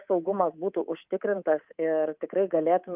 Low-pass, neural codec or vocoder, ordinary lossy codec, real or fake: 3.6 kHz; codec, 24 kHz, 3.1 kbps, DualCodec; Opus, 32 kbps; fake